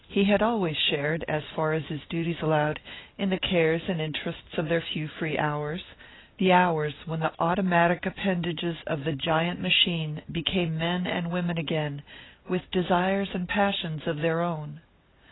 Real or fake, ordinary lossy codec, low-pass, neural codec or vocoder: real; AAC, 16 kbps; 7.2 kHz; none